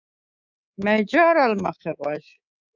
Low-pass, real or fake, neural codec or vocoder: 7.2 kHz; fake; codec, 24 kHz, 3.1 kbps, DualCodec